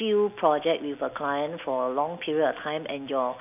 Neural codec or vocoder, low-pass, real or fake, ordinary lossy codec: none; 3.6 kHz; real; none